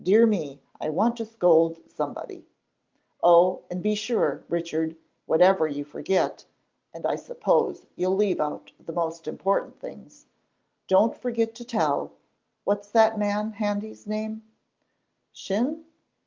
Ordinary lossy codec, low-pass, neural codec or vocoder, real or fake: Opus, 32 kbps; 7.2 kHz; none; real